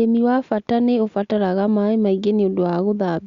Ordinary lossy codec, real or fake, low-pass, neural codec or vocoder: Opus, 64 kbps; real; 7.2 kHz; none